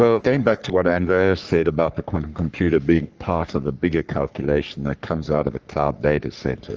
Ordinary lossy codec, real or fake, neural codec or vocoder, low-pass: Opus, 24 kbps; fake; codec, 44.1 kHz, 3.4 kbps, Pupu-Codec; 7.2 kHz